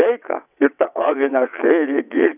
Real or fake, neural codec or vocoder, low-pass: fake; vocoder, 22.05 kHz, 80 mel bands, WaveNeXt; 3.6 kHz